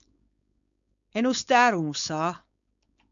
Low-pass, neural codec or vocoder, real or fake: 7.2 kHz; codec, 16 kHz, 4.8 kbps, FACodec; fake